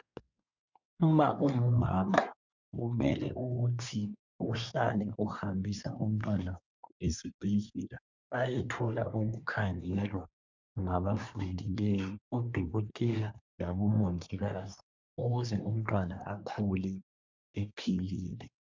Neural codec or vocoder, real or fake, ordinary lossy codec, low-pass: codec, 24 kHz, 1 kbps, SNAC; fake; MP3, 64 kbps; 7.2 kHz